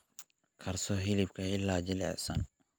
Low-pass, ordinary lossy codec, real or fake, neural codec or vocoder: none; none; real; none